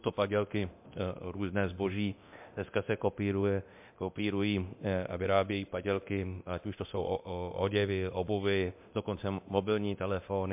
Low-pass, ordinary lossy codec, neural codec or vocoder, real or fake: 3.6 kHz; MP3, 32 kbps; codec, 24 kHz, 0.9 kbps, DualCodec; fake